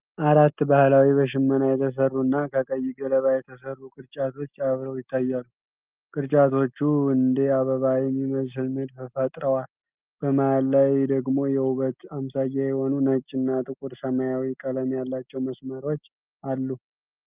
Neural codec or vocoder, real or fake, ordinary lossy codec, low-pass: none; real; Opus, 32 kbps; 3.6 kHz